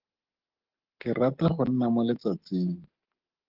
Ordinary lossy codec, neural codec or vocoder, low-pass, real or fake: Opus, 16 kbps; none; 5.4 kHz; real